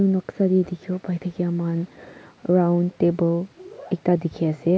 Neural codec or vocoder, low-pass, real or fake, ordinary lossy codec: none; none; real; none